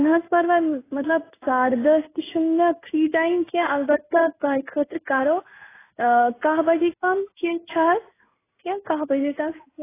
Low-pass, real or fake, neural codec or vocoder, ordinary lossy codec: 3.6 kHz; fake; codec, 16 kHz in and 24 kHz out, 1 kbps, XY-Tokenizer; AAC, 16 kbps